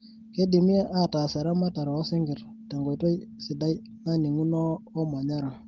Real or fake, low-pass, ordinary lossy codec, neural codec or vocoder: real; 7.2 kHz; Opus, 16 kbps; none